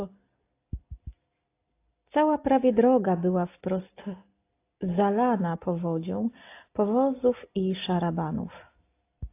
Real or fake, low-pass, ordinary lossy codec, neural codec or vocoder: real; 3.6 kHz; AAC, 24 kbps; none